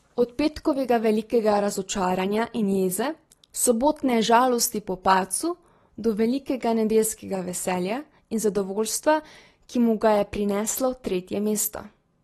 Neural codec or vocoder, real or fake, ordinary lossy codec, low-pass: none; real; AAC, 32 kbps; 19.8 kHz